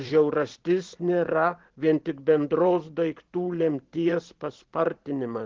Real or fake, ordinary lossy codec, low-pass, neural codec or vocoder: fake; Opus, 16 kbps; 7.2 kHz; vocoder, 44.1 kHz, 128 mel bands every 512 samples, BigVGAN v2